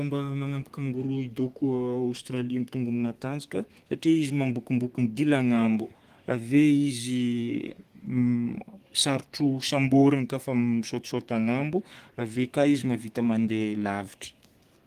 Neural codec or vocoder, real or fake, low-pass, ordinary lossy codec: codec, 32 kHz, 1.9 kbps, SNAC; fake; 14.4 kHz; Opus, 24 kbps